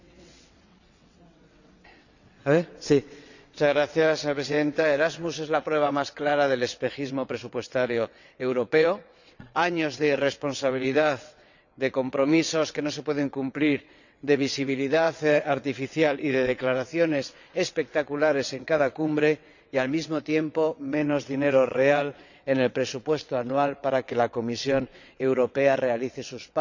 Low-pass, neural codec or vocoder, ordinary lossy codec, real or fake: 7.2 kHz; vocoder, 22.05 kHz, 80 mel bands, WaveNeXt; none; fake